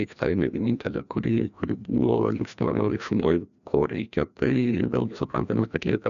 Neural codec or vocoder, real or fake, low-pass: codec, 16 kHz, 1 kbps, FreqCodec, larger model; fake; 7.2 kHz